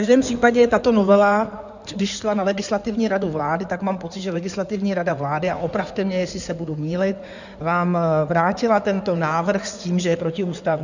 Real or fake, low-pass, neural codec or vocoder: fake; 7.2 kHz; codec, 16 kHz in and 24 kHz out, 2.2 kbps, FireRedTTS-2 codec